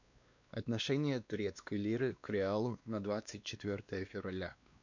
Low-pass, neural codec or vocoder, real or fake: 7.2 kHz; codec, 16 kHz, 2 kbps, X-Codec, WavLM features, trained on Multilingual LibriSpeech; fake